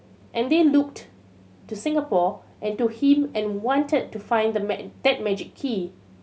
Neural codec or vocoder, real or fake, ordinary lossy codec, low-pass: none; real; none; none